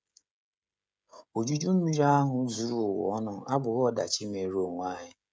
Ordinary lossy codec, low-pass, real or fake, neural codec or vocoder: none; none; fake; codec, 16 kHz, 16 kbps, FreqCodec, smaller model